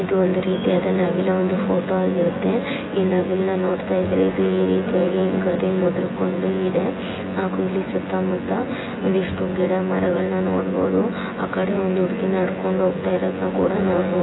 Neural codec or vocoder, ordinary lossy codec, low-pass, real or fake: vocoder, 24 kHz, 100 mel bands, Vocos; AAC, 16 kbps; 7.2 kHz; fake